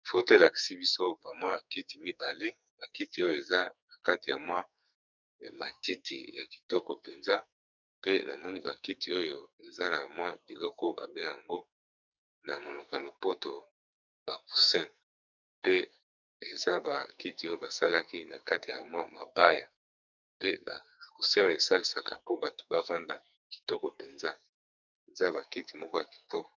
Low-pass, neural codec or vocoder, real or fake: 7.2 kHz; codec, 44.1 kHz, 2.6 kbps, SNAC; fake